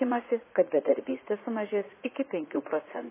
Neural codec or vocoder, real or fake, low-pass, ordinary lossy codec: vocoder, 44.1 kHz, 80 mel bands, Vocos; fake; 3.6 kHz; MP3, 16 kbps